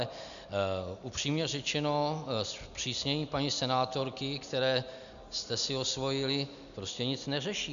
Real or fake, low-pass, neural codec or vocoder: real; 7.2 kHz; none